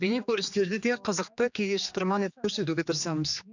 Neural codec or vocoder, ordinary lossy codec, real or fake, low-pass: codec, 16 kHz, 2 kbps, X-Codec, HuBERT features, trained on general audio; none; fake; 7.2 kHz